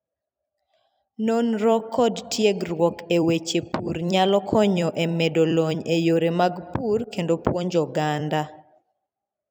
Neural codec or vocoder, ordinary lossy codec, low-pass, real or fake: vocoder, 44.1 kHz, 128 mel bands every 256 samples, BigVGAN v2; none; none; fake